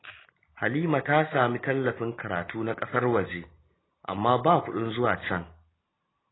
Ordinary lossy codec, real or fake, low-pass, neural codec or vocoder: AAC, 16 kbps; real; 7.2 kHz; none